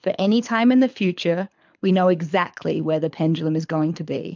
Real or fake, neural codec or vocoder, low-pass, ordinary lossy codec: fake; codec, 24 kHz, 6 kbps, HILCodec; 7.2 kHz; MP3, 64 kbps